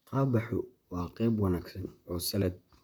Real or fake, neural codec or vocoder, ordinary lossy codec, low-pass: fake; vocoder, 44.1 kHz, 128 mel bands, Pupu-Vocoder; none; none